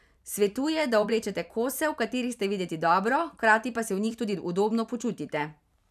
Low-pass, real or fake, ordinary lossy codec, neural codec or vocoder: 14.4 kHz; fake; none; vocoder, 44.1 kHz, 128 mel bands every 512 samples, BigVGAN v2